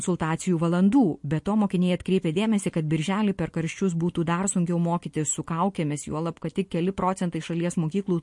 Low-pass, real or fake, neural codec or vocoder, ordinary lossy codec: 10.8 kHz; real; none; MP3, 48 kbps